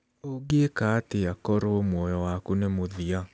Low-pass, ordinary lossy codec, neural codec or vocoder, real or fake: none; none; none; real